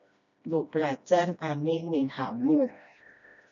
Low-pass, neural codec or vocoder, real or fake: 7.2 kHz; codec, 16 kHz, 1 kbps, FreqCodec, smaller model; fake